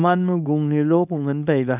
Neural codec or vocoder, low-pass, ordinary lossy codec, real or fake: codec, 24 kHz, 0.9 kbps, WavTokenizer, small release; 3.6 kHz; none; fake